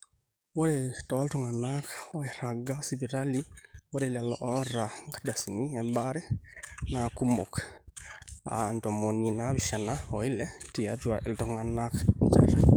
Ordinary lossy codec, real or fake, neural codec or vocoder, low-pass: none; fake; codec, 44.1 kHz, 7.8 kbps, DAC; none